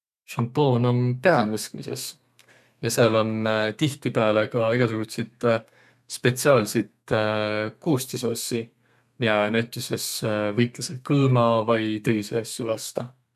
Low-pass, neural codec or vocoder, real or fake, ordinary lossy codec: 14.4 kHz; codec, 32 kHz, 1.9 kbps, SNAC; fake; none